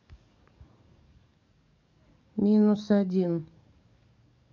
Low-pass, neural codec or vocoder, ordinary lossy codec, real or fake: 7.2 kHz; codec, 44.1 kHz, 7.8 kbps, DAC; none; fake